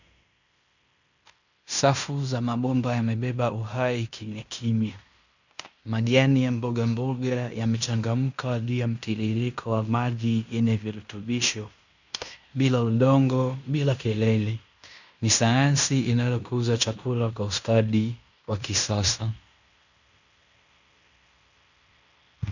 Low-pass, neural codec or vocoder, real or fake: 7.2 kHz; codec, 16 kHz in and 24 kHz out, 0.9 kbps, LongCat-Audio-Codec, fine tuned four codebook decoder; fake